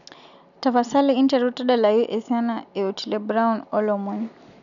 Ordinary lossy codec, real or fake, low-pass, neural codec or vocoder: none; real; 7.2 kHz; none